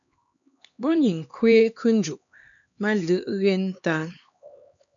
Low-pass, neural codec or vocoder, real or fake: 7.2 kHz; codec, 16 kHz, 2 kbps, X-Codec, HuBERT features, trained on LibriSpeech; fake